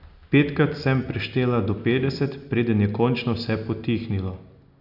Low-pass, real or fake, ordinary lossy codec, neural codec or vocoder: 5.4 kHz; real; none; none